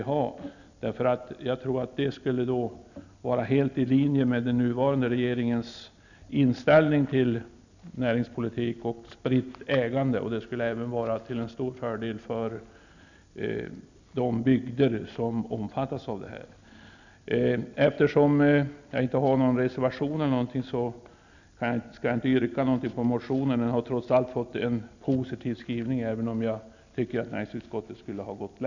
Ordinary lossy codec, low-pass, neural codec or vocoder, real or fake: none; 7.2 kHz; none; real